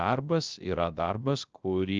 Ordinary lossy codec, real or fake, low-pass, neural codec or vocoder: Opus, 24 kbps; fake; 7.2 kHz; codec, 16 kHz, 0.3 kbps, FocalCodec